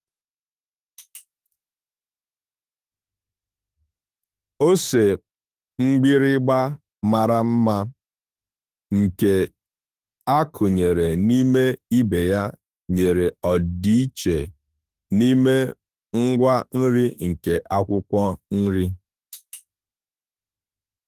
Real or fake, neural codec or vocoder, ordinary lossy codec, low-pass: fake; autoencoder, 48 kHz, 32 numbers a frame, DAC-VAE, trained on Japanese speech; Opus, 24 kbps; 14.4 kHz